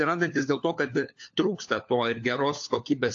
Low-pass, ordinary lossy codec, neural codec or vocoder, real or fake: 7.2 kHz; AAC, 48 kbps; codec, 16 kHz, 4 kbps, FunCodec, trained on LibriTTS, 50 frames a second; fake